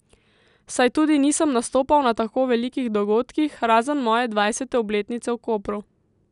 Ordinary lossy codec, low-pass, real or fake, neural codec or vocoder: none; 10.8 kHz; real; none